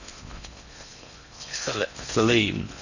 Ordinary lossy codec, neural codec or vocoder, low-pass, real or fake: none; codec, 16 kHz in and 24 kHz out, 0.6 kbps, FocalCodec, streaming, 4096 codes; 7.2 kHz; fake